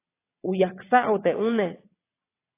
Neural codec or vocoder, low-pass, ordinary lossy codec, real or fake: vocoder, 22.05 kHz, 80 mel bands, WaveNeXt; 3.6 kHz; AAC, 24 kbps; fake